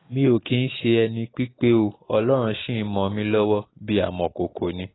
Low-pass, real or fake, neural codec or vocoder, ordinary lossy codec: 7.2 kHz; real; none; AAC, 16 kbps